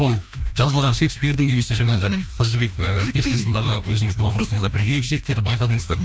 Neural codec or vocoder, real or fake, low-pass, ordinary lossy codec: codec, 16 kHz, 1 kbps, FreqCodec, larger model; fake; none; none